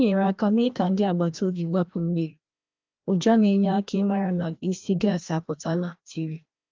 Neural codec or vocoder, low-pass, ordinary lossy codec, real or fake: codec, 16 kHz, 1 kbps, FreqCodec, larger model; 7.2 kHz; Opus, 24 kbps; fake